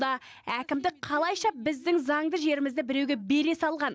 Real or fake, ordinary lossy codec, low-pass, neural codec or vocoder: real; none; none; none